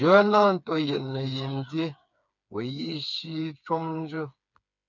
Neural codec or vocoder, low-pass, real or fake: codec, 16 kHz, 4 kbps, FreqCodec, smaller model; 7.2 kHz; fake